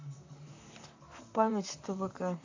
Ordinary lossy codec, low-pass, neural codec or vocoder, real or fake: AAC, 48 kbps; 7.2 kHz; vocoder, 44.1 kHz, 128 mel bands, Pupu-Vocoder; fake